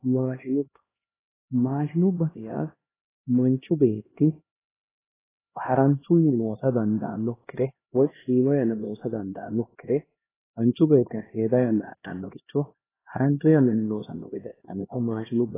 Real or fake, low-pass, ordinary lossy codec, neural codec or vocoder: fake; 3.6 kHz; AAC, 16 kbps; codec, 16 kHz, 1 kbps, X-Codec, HuBERT features, trained on LibriSpeech